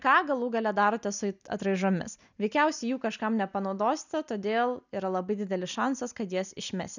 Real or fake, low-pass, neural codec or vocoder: real; 7.2 kHz; none